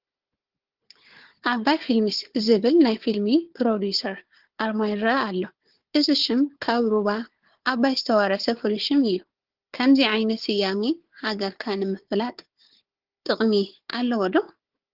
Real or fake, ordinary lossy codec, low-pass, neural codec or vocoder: fake; Opus, 16 kbps; 5.4 kHz; codec, 16 kHz, 4 kbps, FunCodec, trained on Chinese and English, 50 frames a second